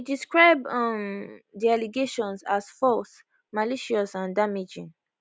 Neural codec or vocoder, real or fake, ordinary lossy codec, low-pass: none; real; none; none